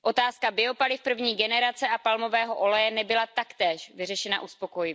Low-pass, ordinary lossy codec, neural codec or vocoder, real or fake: none; none; none; real